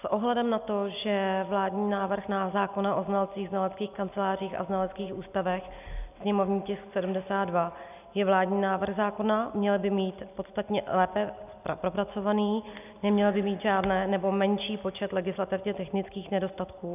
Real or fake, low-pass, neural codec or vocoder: real; 3.6 kHz; none